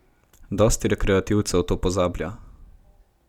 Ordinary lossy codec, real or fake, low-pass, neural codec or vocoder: none; real; 19.8 kHz; none